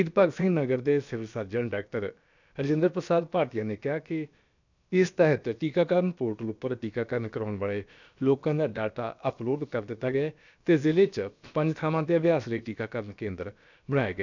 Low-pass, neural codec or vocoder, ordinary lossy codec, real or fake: 7.2 kHz; codec, 16 kHz, about 1 kbps, DyCAST, with the encoder's durations; none; fake